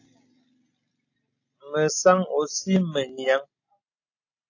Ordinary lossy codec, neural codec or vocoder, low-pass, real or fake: AAC, 48 kbps; none; 7.2 kHz; real